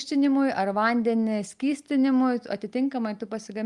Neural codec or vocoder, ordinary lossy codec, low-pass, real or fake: none; Opus, 32 kbps; 10.8 kHz; real